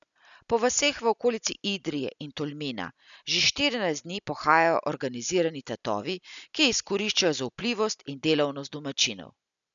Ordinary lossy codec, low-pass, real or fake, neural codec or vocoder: none; 7.2 kHz; real; none